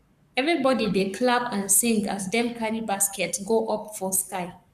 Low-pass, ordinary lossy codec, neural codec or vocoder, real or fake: 14.4 kHz; none; codec, 44.1 kHz, 7.8 kbps, Pupu-Codec; fake